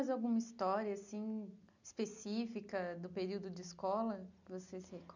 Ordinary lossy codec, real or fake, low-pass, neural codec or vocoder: none; real; 7.2 kHz; none